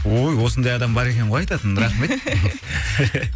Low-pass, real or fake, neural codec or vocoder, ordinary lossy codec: none; real; none; none